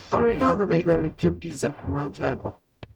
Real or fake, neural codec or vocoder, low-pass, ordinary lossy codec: fake; codec, 44.1 kHz, 0.9 kbps, DAC; 19.8 kHz; none